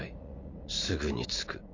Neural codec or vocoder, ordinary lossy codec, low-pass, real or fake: vocoder, 44.1 kHz, 80 mel bands, Vocos; MP3, 48 kbps; 7.2 kHz; fake